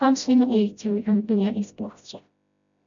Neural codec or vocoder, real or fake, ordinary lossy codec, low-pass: codec, 16 kHz, 0.5 kbps, FreqCodec, smaller model; fake; MP3, 64 kbps; 7.2 kHz